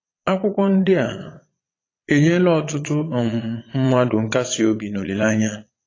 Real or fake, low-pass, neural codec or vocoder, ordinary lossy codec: fake; 7.2 kHz; vocoder, 22.05 kHz, 80 mel bands, Vocos; AAC, 32 kbps